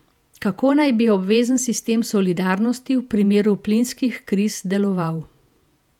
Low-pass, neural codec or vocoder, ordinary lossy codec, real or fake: 19.8 kHz; vocoder, 48 kHz, 128 mel bands, Vocos; none; fake